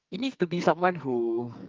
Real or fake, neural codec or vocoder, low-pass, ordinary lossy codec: fake; codec, 44.1 kHz, 2.6 kbps, SNAC; 7.2 kHz; Opus, 24 kbps